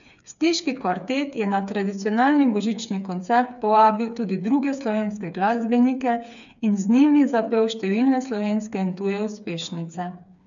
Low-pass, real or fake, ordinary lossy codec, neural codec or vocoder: 7.2 kHz; fake; none; codec, 16 kHz, 4 kbps, FreqCodec, smaller model